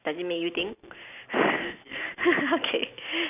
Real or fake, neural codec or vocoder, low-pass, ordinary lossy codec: real; none; 3.6 kHz; none